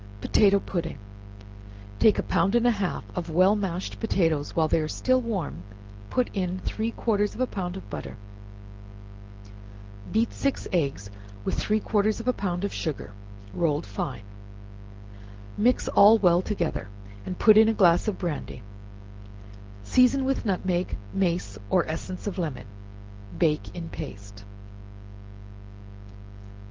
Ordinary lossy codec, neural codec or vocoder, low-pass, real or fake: Opus, 16 kbps; none; 7.2 kHz; real